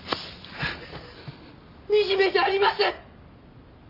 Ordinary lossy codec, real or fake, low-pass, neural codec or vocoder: none; real; 5.4 kHz; none